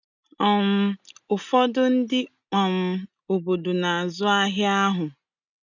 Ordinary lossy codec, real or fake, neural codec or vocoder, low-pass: none; real; none; 7.2 kHz